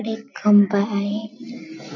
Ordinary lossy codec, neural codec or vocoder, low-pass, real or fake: none; none; 7.2 kHz; real